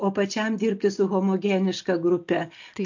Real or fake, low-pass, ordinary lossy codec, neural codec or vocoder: real; 7.2 kHz; MP3, 48 kbps; none